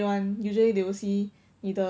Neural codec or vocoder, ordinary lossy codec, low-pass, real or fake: none; none; none; real